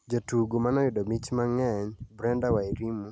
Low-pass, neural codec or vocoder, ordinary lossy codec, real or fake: none; none; none; real